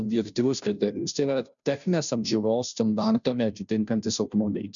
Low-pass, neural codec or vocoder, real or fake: 7.2 kHz; codec, 16 kHz, 0.5 kbps, FunCodec, trained on Chinese and English, 25 frames a second; fake